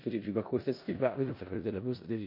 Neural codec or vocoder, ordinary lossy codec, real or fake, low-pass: codec, 16 kHz in and 24 kHz out, 0.4 kbps, LongCat-Audio-Codec, four codebook decoder; MP3, 32 kbps; fake; 5.4 kHz